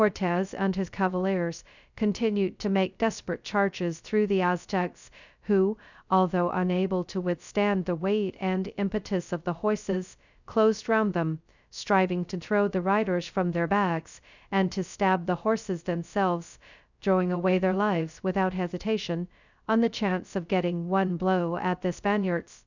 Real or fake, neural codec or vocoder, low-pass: fake; codec, 16 kHz, 0.2 kbps, FocalCodec; 7.2 kHz